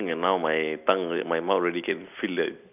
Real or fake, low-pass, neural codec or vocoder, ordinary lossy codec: real; 3.6 kHz; none; none